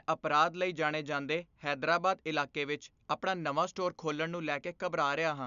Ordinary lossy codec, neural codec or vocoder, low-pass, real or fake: none; none; 7.2 kHz; real